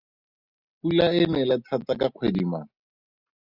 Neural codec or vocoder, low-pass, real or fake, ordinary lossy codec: none; 5.4 kHz; real; Opus, 64 kbps